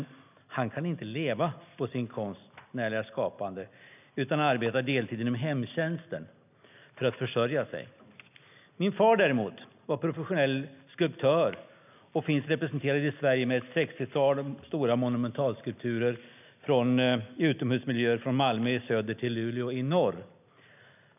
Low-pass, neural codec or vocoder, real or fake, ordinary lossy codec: 3.6 kHz; none; real; none